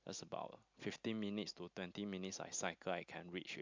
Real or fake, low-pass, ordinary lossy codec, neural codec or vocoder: real; 7.2 kHz; MP3, 64 kbps; none